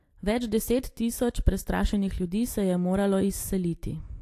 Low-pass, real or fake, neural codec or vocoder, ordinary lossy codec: 14.4 kHz; real; none; AAC, 64 kbps